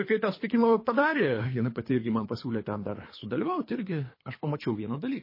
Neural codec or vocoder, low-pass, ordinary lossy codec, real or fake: codec, 24 kHz, 3 kbps, HILCodec; 5.4 kHz; MP3, 24 kbps; fake